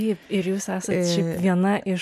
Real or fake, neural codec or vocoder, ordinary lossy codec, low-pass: real; none; MP3, 96 kbps; 14.4 kHz